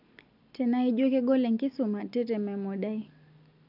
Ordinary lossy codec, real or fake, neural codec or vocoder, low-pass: none; real; none; 5.4 kHz